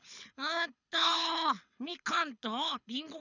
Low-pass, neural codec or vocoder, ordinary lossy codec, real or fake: 7.2 kHz; codec, 24 kHz, 6 kbps, HILCodec; none; fake